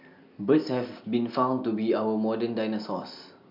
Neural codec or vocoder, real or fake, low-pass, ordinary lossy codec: none; real; 5.4 kHz; none